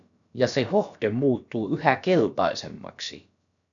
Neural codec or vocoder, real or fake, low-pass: codec, 16 kHz, about 1 kbps, DyCAST, with the encoder's durations; fake; 7.2 kHz